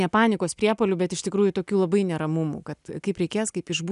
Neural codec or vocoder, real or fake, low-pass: none; real; 10.8 kHz